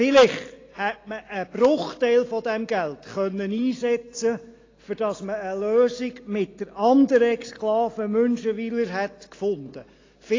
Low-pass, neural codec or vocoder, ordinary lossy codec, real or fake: 7.2 kHz; none; AAC, 32 kbps; real